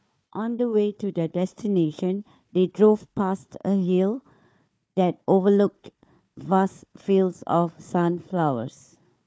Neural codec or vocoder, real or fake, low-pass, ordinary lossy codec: codec, 16 kHz, 4 kbps, FunCodec, trained on Chinese and English, 50 frames a second; fake; none; none